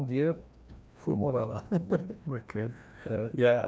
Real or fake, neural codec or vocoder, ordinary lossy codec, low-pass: fake; codec, 16 kHz, 1 kbps, FreqCodec, larger model; none; none